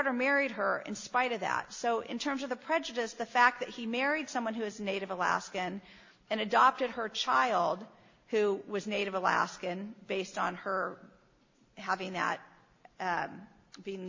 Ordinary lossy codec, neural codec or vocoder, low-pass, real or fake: MP3, 32 kbps; none; 7.2 kHz; real